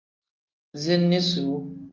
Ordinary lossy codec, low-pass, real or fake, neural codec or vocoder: Opus, 24 kbps; 7.2 kHz; real; none